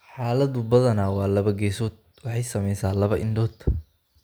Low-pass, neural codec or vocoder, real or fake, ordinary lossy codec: none; none; real; none